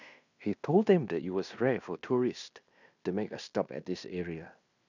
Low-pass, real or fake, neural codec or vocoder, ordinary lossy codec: 7.2 kHz; fake; codec, 16 kHz in and 24 kHz out, 0.9 kbps, LongCat-Audio-Codec, fine tuned four codebook decoder; none